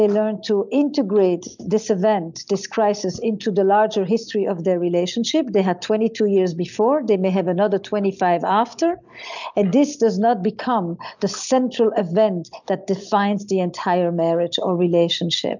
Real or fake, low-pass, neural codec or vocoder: real; 7.2 kHz; none